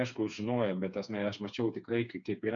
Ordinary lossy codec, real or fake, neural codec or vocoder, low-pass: MP3, 64 kbps; fake; codec, 16 kHz, 4 kbps, FreqCodec, smaller model; 7.2 kHz